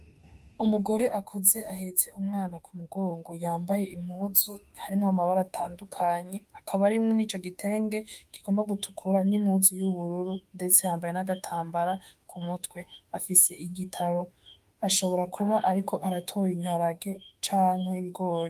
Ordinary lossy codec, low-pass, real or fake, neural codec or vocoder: Opus, 32 kbps; 14.4 kHz; fake; autoencoder, 48 kHz, 32 numbers a frame, DAC-VAE, trained on Japanese speech